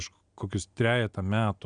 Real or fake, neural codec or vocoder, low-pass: real; none; 9.9 kHz